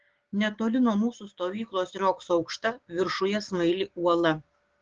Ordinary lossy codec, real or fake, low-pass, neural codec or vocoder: Opus, 16 kbps; fake; 7.2 kHz; codec, 16 kHz, 6 kbps, DAC